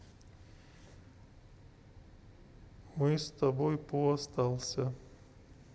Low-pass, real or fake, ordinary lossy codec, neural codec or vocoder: none; real; none; none